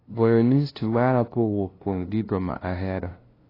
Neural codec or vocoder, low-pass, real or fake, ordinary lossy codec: codec, 16 kHz, 0.5 kbps, FunCodec, trained on LibriTTS, 25 frames a second; 5.4 kHz; fake; AAC, 24 kbps